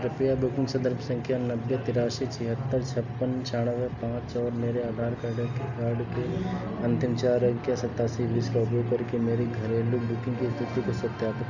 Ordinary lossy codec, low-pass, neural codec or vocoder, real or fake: none; 7.2 kHz; none; real